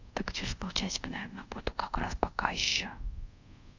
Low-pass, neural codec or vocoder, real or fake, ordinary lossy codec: 7.2 kHz; codec, 24 kHz, 0.5 kbps, DualCodec; fake; none